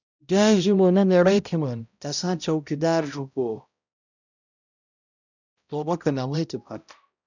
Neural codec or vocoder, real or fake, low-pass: codec, 16 kHz, 0.5 kbps, X-Codec, HuBERT features, trained on balanced general audio; fake; 7.2 kHz